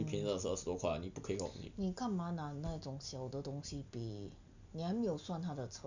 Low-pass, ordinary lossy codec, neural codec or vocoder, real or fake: 7.2 kHz; none; none; real